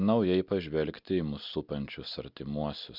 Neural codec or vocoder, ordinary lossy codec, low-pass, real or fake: none; Opus, 64 kbps; 5.4 kHz; real